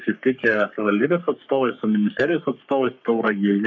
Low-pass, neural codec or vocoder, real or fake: 7.2 kHz; codec, 44.1 kHz, 3.4 kbps, Pupu-Codec; fake